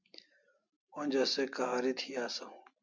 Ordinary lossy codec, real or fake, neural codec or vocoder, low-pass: MP3, 64 kbps; real; none; 7.2 kHz